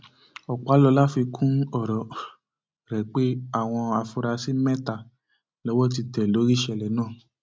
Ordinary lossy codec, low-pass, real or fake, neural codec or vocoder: none; none; real; none